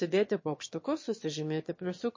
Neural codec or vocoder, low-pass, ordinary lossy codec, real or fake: autoencoder, 22.05 kHz, a latent of 192 numbers a frame, VITS, trained on one speaker; 7.2 kHz; MP3, 32 kbps; fake